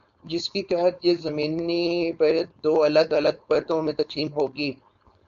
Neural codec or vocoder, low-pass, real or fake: codec, 16 kHz, 4.8 kbps, FACodec; 7.2 kHz; fake